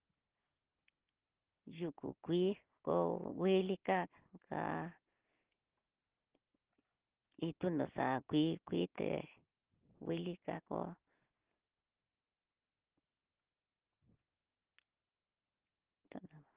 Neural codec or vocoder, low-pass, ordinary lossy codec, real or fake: none; 3.6 kHz; Opus, 16 kbps; real